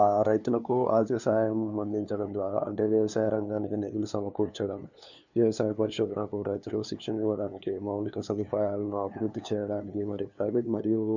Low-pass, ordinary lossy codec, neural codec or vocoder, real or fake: 7.2 kHz; none; codec, 16 kHz, 2 kbps, FunCodec, trained on LibriTTS, 25 frames a second; fake